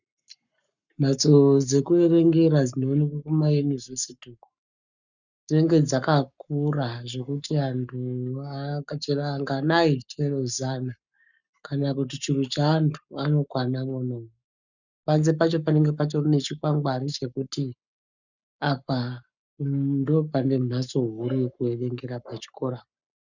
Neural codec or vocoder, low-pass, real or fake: codec, 44.1 kHz, 7.8 kbps, Pupu-Codec; 7.2 kHz; fake